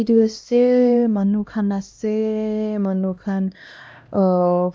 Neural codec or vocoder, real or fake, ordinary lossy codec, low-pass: codec, 16 kHz, 1 kbps, X-Codec, HuBERT features, trained on LibriSpeech; fake; none; none